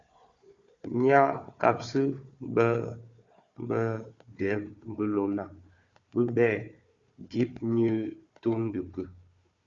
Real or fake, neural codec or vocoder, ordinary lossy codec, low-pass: fake; codec, 16 kHz, 4 kbps, FunCodec, trained on Chinese and English, 50 frames a second; Opus, 64 kbps; 7.2 kHz